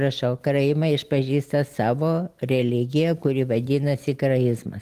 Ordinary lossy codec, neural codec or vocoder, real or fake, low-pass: Opus, 24 kbps; none; real; 14.4 kHz